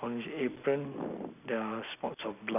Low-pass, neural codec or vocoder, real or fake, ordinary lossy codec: 3.6 kHz; none; real; none